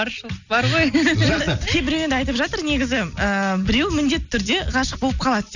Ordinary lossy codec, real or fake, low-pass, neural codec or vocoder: none; real; 7.2 kHz; none